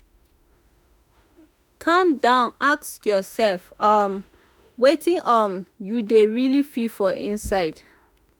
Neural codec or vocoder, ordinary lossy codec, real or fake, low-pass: autoencoder, 48 kHz, 32 numbers a frame, DAC-VAE, trained on Japanese speech; none; fake; none